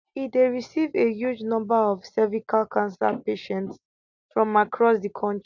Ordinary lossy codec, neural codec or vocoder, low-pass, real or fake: none; none; 7.2 kHz; real